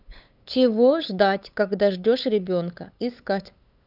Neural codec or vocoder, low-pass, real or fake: codec, 16 kHz, 8 kbps, FunCodec, trained on LibriTTS, 25 frames a second; 5.4 kHz; fake